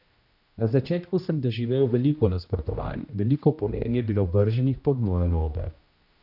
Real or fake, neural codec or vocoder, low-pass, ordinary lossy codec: fake; codec, 16 kHz, 1 kbps, X-Codec, HuBERT features, trained on general audio; 5.4 kHz; none